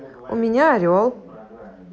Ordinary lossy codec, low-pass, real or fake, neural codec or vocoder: none; none; real; none